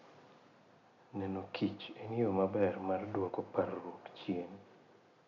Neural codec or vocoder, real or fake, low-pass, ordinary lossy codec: none; real; 7.2 kHz; none